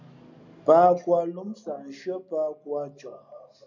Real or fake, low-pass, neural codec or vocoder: real; 7.2 kHz; none